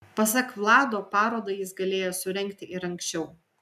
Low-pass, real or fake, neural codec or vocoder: 14.4 kHz; real; none